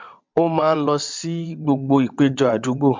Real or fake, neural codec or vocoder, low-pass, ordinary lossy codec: fake; vocoder, 22.05 kHz, 80 mel bands, WaveNeXt; 7.2 kHz; MP3, 64 kbps